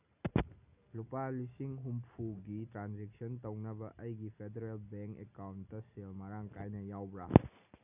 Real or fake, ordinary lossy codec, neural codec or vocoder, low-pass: real; none; none; 3.6 kHz